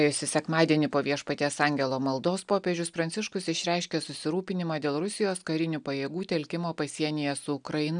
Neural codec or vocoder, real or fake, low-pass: none; real; 9.9 kHz